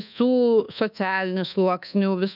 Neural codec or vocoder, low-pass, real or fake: codec, 24 kHz, 1.2 kbps, DualCodec; 5.4 kHz; fake